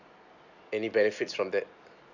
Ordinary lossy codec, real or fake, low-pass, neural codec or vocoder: none; real; 7.2 kHz; none